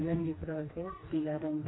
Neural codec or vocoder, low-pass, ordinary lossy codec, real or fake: codec, 16 kHz, 2 kbps, FreqCodec, smaller model; 7.2 kHz; AAC, 16 kbps; fake